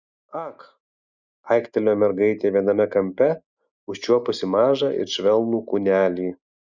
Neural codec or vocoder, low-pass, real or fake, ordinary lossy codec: none; 7.2 kHz; real; Opus, 64 kbps